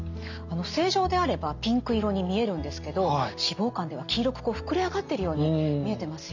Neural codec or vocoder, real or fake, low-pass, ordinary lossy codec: none; real; 7.2 kHz; none